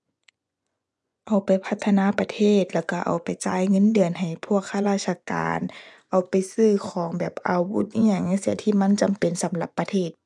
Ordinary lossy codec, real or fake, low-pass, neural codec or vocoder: none; fake; none; vocoder, 24 kHz, 100 mel bands, Vocos